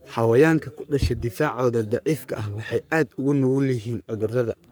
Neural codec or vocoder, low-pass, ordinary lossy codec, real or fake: codec, 44.1 kHz, 3.4 kbps, Pupu-Codec; none; none; fake